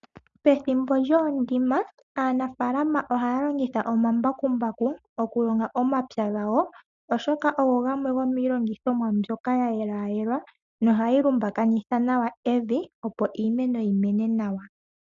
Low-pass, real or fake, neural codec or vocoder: 7.2 kHz; real; none